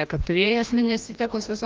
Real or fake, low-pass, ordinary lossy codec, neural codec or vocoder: fake; 7.2 kHz; Opus, 24 kbps; codec, 16 kHz, 1 kbps, FreqCodec, larger model